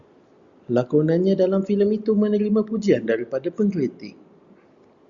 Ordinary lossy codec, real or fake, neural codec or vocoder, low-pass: Opus, 64 kbps; real; none; 7.2 kHz